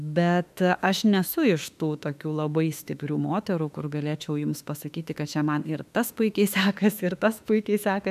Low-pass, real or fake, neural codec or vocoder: 14.4 kHz; fake; autoencoder, 48 kHz, 32 numbers a frame, DAC-VAE, trained on Japanese speech